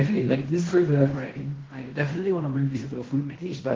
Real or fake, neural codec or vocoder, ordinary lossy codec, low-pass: fake; codec, 16 kHz in and 24 kHz out, 0.9 kbps, LongCat-Audio-Codec, fine tuned four codebook decoder; Opus, 16 kbps; 7.2 kHz